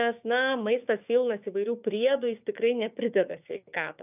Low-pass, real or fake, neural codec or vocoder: 3.6 kHz; fake; codec, 16 kHz, 6 kbps, DAC